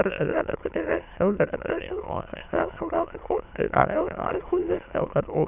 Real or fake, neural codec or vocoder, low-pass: fake; autoencoder, 22.05 kHz, a latent of 192 numbers a frame, VITS, trained on many speakers; 3.6 kHz